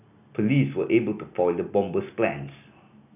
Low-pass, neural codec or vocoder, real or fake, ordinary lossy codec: 3.6 kHz; none; real; none